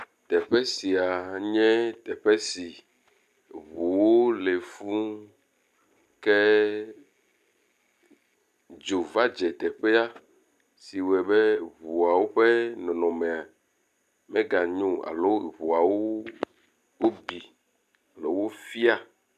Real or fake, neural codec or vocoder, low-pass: real; none; 14.4 kHz